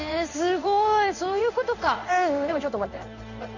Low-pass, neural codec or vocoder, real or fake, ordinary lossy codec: 7.2 kHz; codec, 16 kHz in and 24 kHz out, 1 kbps, XY-Tokenizer; fake; none